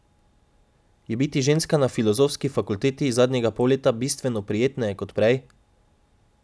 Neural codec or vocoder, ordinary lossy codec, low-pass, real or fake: none; none; none; real